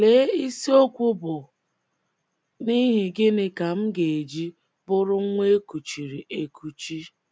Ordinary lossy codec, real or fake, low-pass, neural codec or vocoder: none; real; none; none